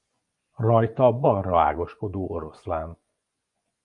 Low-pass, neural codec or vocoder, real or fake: 10.8 kHz; vocoder, 24 kHz, 100 mel bands, Vocos; fake